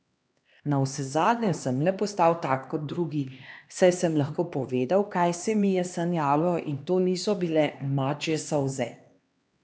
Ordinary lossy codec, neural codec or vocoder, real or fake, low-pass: none; codec, 16 kHz, 2 kbps, X-Codec, HuBERT features, trained on LibriSpeech; fake; none